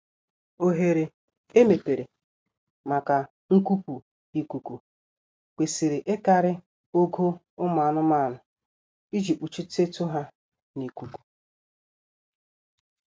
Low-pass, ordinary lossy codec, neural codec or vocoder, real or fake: none; none; none; real